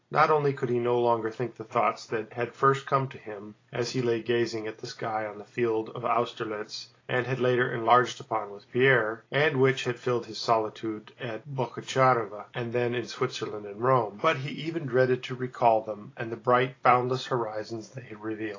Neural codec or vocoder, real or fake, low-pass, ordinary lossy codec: none; real; 7.2 kHz; AAC, 32 kbps